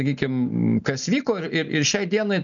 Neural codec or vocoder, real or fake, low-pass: none; real; 7.2 kHz